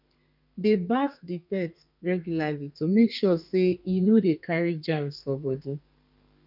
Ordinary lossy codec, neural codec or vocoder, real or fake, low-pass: none; codec, 32 kHz, 1.9 kbps, SNAC; fake; 5.4 kHz